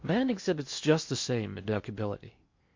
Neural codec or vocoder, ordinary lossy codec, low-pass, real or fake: codec, 16 kHz in and 24 kHz out, 0.6 kbps, FocalCodec, streaming, 2048 codes; MP3, 48 kbps; 7.2 kHz; fake